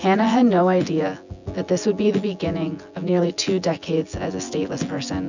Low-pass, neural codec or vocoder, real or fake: 7.2 kHz; vocoder, 24 kHz, 100 mel bands, Vocos; fake